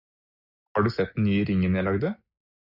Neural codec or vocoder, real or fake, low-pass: none; real; 5.4 kHz